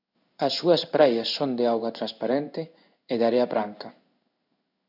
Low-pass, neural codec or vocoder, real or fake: 5.4 kHz; codec, 16 kHz in and 24 kHz out, 1 kbps, XY-Tokenizer; fake